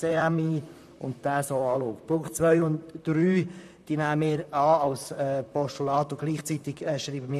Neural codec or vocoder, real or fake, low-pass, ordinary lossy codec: vocoder, 44.1 kHz, 128 mel bands, Pupu-Vocoder; fake; 14.4 kHz; none